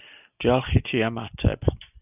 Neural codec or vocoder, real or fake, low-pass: none; real; 3.6 kHz